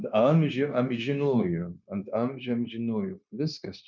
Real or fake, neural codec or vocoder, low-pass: fake; codec, 16 kHz, 0.9 kbps, LongCat-Audio-Codec; 7.2 kHz